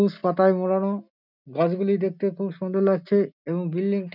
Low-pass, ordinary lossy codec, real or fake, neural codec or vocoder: 5.4 kHz; none; real; none